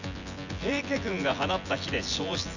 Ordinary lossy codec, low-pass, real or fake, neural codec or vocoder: none; 7.2 kHz; fake; vocoder, 24 kHz, 100 mel bands, Vocos